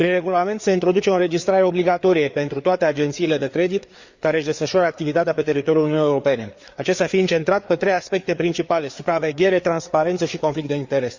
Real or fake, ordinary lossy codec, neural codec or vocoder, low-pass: fake; Opus, 64 kbps; codec, 16 kHz, 4 kbps, FreqCodec, larger model; 7.2 kHz